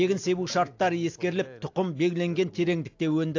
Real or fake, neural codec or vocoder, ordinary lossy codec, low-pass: real; none; AAC, 48 kbps; 7.2 kHz